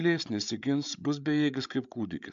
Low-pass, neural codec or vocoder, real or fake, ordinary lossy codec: 7.2 kHz; codec, 16 kHz, 8 kbps, FreqCodec, larger model; fake; MP3, 64 kbps